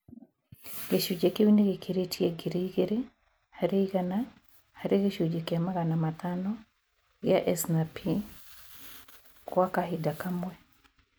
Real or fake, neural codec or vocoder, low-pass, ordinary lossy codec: real; none; none; none